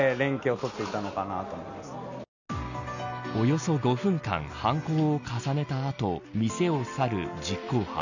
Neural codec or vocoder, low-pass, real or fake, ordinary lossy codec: none; 7.2 kHz; real; none